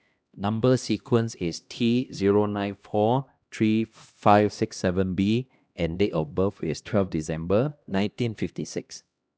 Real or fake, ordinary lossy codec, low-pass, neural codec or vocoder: fake; none; none; codec, 16 kHz, 1 kbps, X-Codec, HuBERT features, trained on LibriSpeech